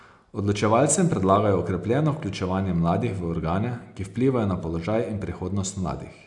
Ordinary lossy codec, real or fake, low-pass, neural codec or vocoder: none; real; 10.8 kHz; none